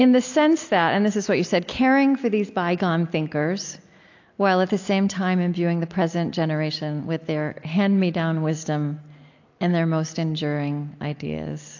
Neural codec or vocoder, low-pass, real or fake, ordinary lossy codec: none; 7.2 kHz; real; AAC, 48 kbps